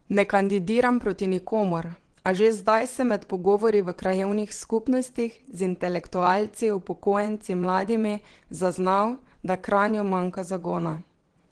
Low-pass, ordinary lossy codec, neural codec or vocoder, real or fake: 9.9 kHz; Opus, 16 kbps; vocoder, 22.05 kHz, 80 mel bands, WaveNeXt; fake